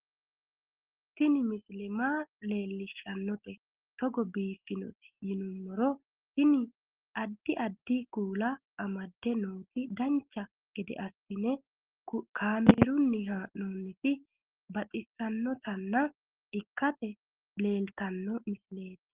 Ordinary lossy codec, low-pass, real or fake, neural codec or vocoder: Opus, 16 kbps; 3.6 kHz; real; none